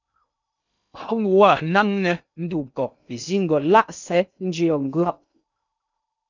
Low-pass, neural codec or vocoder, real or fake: 7.2 kHz; codec, 16 kHz in and 24 kHz out, 0.6 kbps, FocalCodec, streaming, 2048 codes; fake